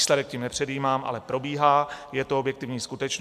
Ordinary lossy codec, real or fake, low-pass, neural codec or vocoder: MP3, 96 kbps; real; 14.4 kHz; none